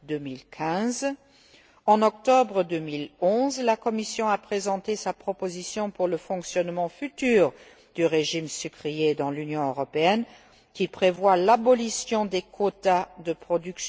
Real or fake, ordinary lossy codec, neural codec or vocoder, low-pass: real; none; none; none